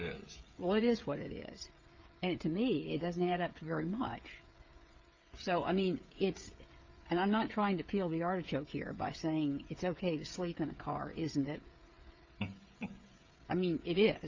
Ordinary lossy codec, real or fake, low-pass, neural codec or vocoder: Opus, 24 kbps; fake; 7.2 kHz; codec, 16 kHz, 16 kbps, FreqCodec, smaller model